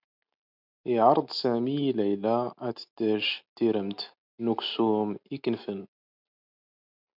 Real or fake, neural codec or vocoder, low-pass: real; none; 5.4 kHz